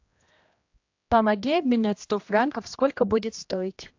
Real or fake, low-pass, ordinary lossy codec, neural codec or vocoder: fake; 7.2 kHz; AAC, 48 kbps; codec, 16 kHz, 1 kbps, X-Codec, HuBERT features, trained on general audio